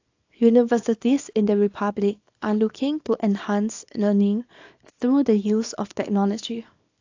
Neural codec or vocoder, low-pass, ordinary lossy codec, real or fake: codec, 24 kHz, 0.9 kbps, WavTokenizer, small release; 7.2 kHz; AAC, 48 kbps; fake